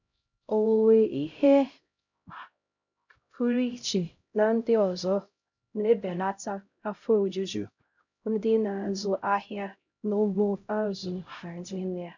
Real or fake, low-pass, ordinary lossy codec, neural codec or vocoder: fake; 7.2 kHz; none; codec, 16 kHz, 0.5 kbps, X-Codec, HuBERT features, trained on LibriSpeech